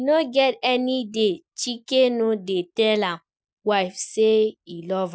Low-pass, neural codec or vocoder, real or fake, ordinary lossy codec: none; none; real; none